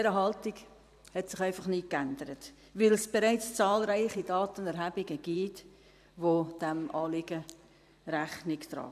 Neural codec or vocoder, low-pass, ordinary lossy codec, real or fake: vocoder, 44.1 kHz, 128 mel bands every 512 samples, BigVGAN v2; 14.4 kHz; none; fake